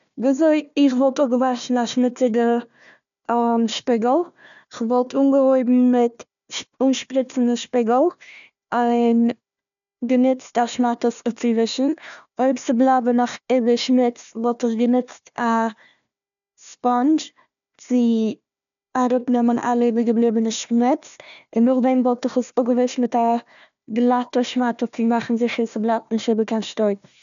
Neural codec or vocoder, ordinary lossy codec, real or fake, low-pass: codec, 16 kHz, 1 kbps, FunCodec, trained on Chinese and English, 50 frames a second; none; fake; 7.2 kHz